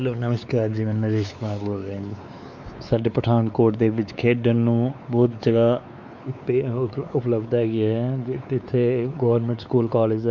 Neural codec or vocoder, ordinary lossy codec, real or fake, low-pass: codec, 16 kHz, 4 kbps, X-Codec, WavLM features, trained on Multilingual LibriSpeech; none; fake; 7.2 kHz